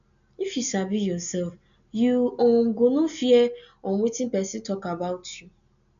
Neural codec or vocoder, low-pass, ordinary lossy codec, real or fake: none; 7.2 kHz; none; real